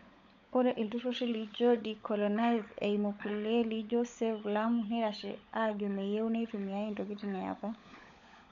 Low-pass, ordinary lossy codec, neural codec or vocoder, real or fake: 7.2 kHz; none; codec, 16 kHz, 16 kbps, FunCodec, trained on LibriTTS, 50 frames a second; fake